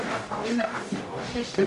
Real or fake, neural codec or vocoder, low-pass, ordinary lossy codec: fake; codec, 44.1 kHz, 0.9 kbps, DAC; 14.4 kHz; MP3, 48 kbps